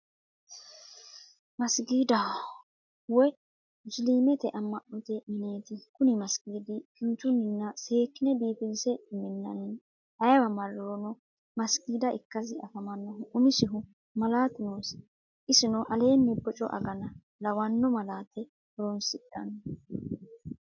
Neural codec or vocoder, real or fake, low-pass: none; real; 7.2 kHz